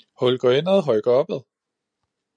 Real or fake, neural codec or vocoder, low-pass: real; none; 9.9 kHz